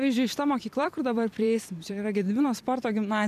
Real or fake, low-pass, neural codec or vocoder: fake; 14.4 kHz; vocoder, 44.1 kHz, 128 mel bands every 256 samples, BigVGAN v2